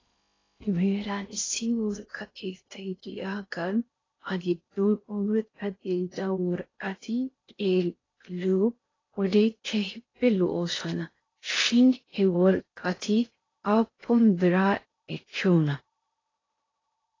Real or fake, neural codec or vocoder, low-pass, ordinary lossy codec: fake; codec, 16 kHz in and 24 kHz out, 0.6 kbps, FocalCodec, streaming, 4096 codes; 7.2 kHz; AAC, 32 kbps